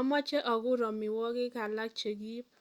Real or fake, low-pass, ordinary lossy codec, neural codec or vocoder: real; 19.8 kHz; Opus, 64 kbps; none